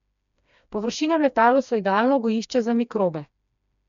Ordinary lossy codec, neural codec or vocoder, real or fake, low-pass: none; codec, 16 kHz, 2 kbps, FreqCodec, smaller model; fake; 7.2 kHz